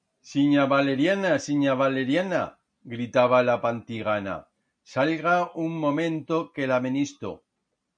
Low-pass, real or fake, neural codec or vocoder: 9.9 kHz; real; none